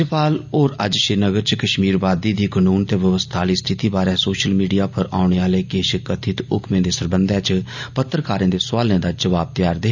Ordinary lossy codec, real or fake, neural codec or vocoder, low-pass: none; real; none; 7.2 kHz